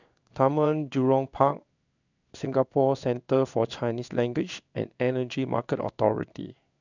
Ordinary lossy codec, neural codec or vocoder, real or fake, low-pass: none; codec, 16 kHz in and 24 kHz out, 1 kbps, XY-Tokenizer; fake; 7.2 kHz